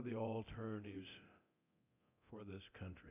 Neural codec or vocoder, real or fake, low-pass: codec, 24 kHz, 0.9 kbps, DualCodec; fake; 3.6 kHz